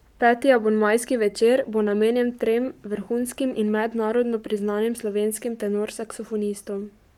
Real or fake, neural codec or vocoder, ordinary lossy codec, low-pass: fake; codec, 44.1 kHz, 7.8 kbps, Pupu-Codec; none; 19.8 kHz